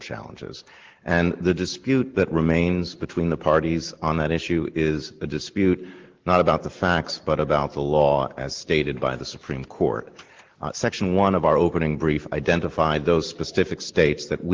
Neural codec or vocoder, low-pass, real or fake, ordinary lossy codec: none; 7.2 kHz; real; Opus, 16 kbps